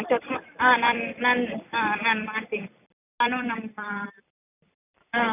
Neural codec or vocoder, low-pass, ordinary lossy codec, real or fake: vocoder, 44.1 kHz, 128 mel bands every 256 samples, BigVGAN v2; 3.6 kHz; none; fake